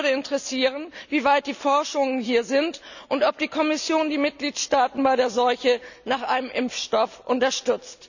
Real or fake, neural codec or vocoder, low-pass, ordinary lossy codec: real; none; 7.2 kHz; none